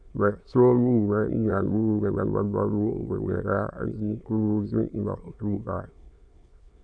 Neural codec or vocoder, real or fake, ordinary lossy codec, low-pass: autoencoder, 22.05 kHz, a latent of 192 numbers a frame, VITS, trained on many speakers; fake; MP3, 96 kbps; 9.9 kHz